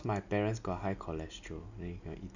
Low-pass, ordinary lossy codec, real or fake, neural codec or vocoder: 7.2 kHz; none; real; none